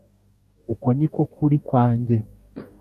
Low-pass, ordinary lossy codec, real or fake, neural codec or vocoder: 14.4 kHz; MP3, 96 kbps; fake; codec, 44.1 kHz, 2.6 kbps, DAC